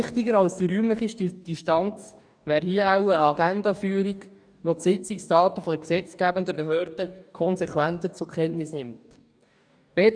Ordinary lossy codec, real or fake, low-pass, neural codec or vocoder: none; fake; 9.9 kHz; codec, 44.1 kHz, 2.6 kbps, DAC